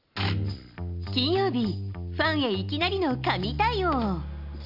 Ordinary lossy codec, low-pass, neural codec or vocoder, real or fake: none; 5.4 kHz; none; real